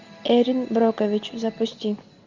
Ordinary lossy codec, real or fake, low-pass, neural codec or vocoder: MP3, 64 kbps; real; 7.2 kHz; none